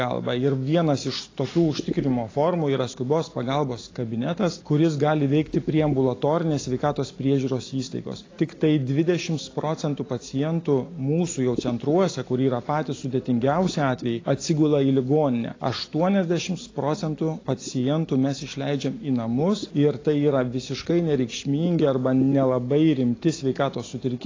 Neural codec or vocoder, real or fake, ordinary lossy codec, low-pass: none; real; AAC, 32 kbps; 7.2 kHz